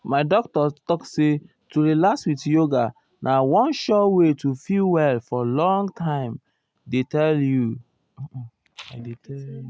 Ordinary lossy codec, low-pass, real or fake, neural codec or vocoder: none; none; real; none